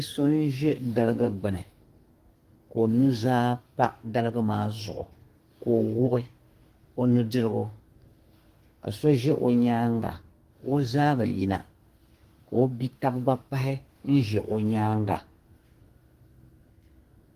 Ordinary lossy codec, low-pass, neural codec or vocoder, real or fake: Opus, 24 kbps; 14.4 kHz; codec, 32 kHz, 1.9 kbps, SNAC; fake